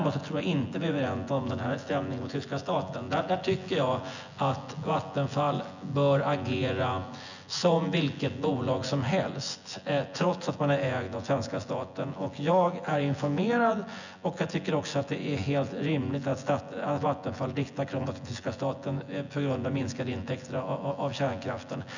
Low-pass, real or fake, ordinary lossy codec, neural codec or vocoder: 7.2 kHz; fake; none; vocoder, 24 kHz, 100 mel bands, Vocos